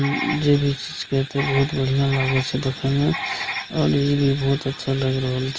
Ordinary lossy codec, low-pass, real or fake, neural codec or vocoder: Opus, 24 kbps; 7.2 kHz; real; none